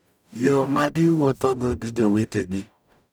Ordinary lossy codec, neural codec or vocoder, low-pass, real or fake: none; codec, 44.1 kHz, 0.9 kbps, DAC; none; fake